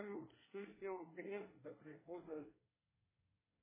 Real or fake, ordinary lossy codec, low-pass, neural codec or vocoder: fake; MP3, 16 kbps; 3.6 kHz; codec, 24 kHz, 1 kbps, SNAC